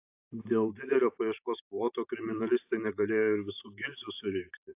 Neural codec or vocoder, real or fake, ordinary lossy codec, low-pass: vocoder, 44.1 kHz, 128 mel bands every 512 samples, BigVGAN v2; fake; AAC, 32 kbps; 3.6 kHz